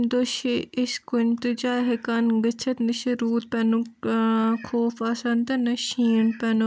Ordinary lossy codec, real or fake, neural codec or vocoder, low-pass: none; real; none; none